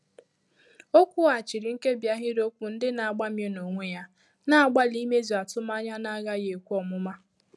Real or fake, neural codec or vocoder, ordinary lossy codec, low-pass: real; none; none; none